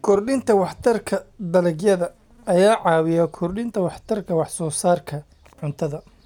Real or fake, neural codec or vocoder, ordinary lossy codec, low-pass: real; none; none; 19.8 kHz